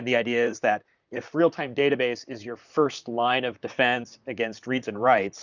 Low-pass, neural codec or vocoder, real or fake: 7.2 kHz; vocoder, 44.1 kHz, 128 mel bands, Pupu-Vocoder; fake